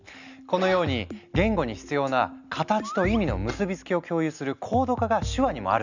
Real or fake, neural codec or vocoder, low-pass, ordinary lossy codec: real; none; 7.2 kHz; none